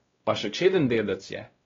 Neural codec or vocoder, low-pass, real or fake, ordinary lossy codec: codec, 16 kHz, 1 kbps, X-Codec, WavLM features, trained on Multilingual LibriSpeech; 7.2 kHz; fake; AAC, 24 kbps